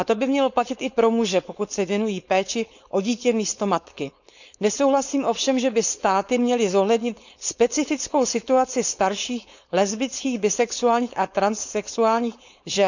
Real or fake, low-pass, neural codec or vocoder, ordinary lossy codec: fake; 7.2 kHz; codec, 16 kHz, 4.8 kbps, FACodec; none